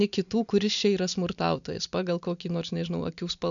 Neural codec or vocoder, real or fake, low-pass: none; real; 7.2 kHz